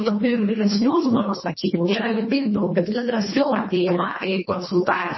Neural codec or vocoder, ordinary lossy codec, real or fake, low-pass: codec, 24 kHz, 1.5 kbps, HILCodec; MP3, 24 kbps; fake; 7.2 kHz